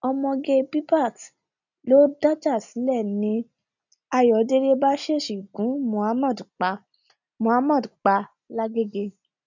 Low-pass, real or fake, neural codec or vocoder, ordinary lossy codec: 7.2 kHz; real; none; none